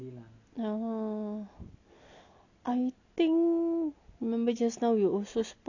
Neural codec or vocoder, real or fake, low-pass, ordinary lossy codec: none; real; 7.2 kHz; none